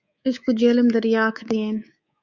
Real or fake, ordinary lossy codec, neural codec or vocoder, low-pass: fake; Opus, 64 kbps; codec, 24 kHz, 3.1 kbps, DualCodec; 7.2 kHz